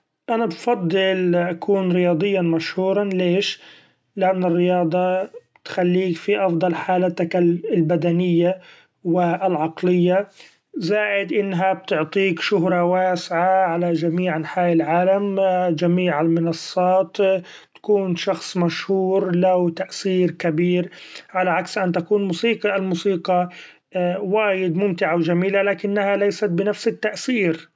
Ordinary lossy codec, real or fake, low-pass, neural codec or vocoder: none; real; none; none